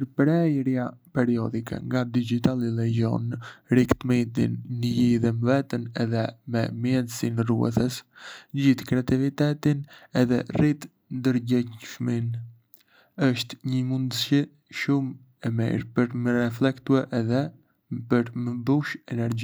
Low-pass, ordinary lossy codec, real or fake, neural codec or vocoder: none; none; real; none